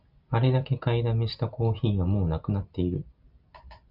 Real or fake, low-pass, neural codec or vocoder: real; 5.4 kHz; none